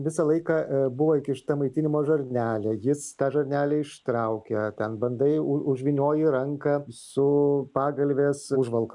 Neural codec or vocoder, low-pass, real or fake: none; 10.8 kHz; real